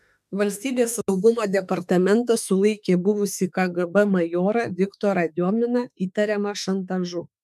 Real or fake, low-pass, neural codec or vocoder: fake; 14.4 kHz; autoencoder, 48 kHz, 32 numbers a frame, DAC-VAE, trained on Japanese speech